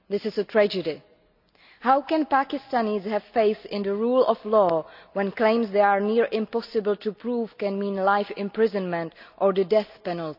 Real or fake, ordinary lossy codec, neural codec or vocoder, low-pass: real; none; none; 5.4 kHz